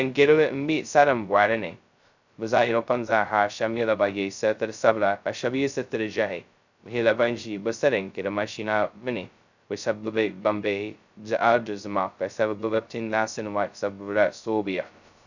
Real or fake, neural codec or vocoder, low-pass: fake; codec, 16 kHz, 0.2 kbps, FocalCodec; 7.2 kHz